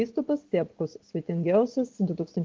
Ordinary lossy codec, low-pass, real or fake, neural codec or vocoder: Opus, 16 kbps; 7.2 kHz; real; none